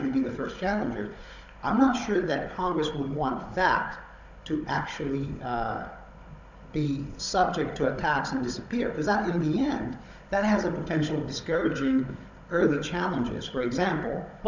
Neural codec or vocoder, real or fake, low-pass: codec, 16 kHz, 16 kbps, FunCodec, trained on Chinese and English, 50 frames a second; fake; 7.2 kHz